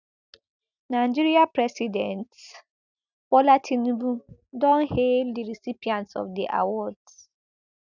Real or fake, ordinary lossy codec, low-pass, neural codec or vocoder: real; none; 7.2 kHz; none